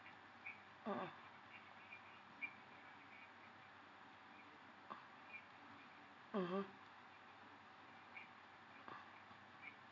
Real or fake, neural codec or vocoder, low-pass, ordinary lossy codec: real; none; 7.2 kHz; none